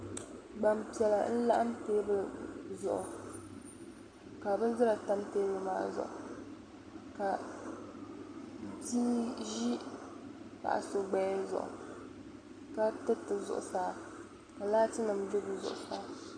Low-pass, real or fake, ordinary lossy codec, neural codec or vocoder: 9.9 kHz; real; MP3, 96 kbps; none